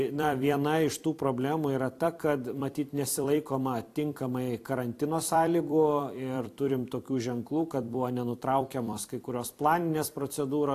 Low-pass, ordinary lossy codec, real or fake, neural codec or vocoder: 14.4 kHz; AAC, 64 kbps; fake; vocoder, 44.1 kHz, 128 mel bands every 256 samples, BigVGAN v2